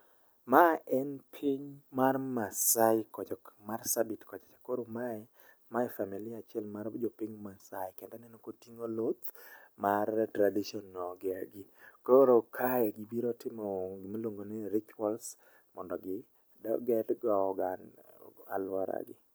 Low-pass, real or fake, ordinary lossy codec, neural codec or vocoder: none; real; none; none